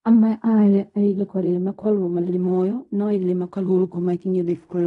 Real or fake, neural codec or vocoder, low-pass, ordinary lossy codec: fake; codec, 16 kHz in and 24 kHz out, 0.4 kbps, LongCat-Audio-Codec, fine tuned four codebook decoder; 10.8 kHz; none